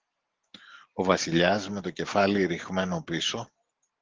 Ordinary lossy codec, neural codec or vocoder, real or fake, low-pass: Opus, 16 kbps; none; real; 7.2 kHz